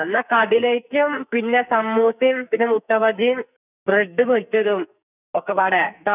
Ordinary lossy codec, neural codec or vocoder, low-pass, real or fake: none; codec, 44.1 kHz, 2.6 kbps, SNAC; 3.6 kHz; fake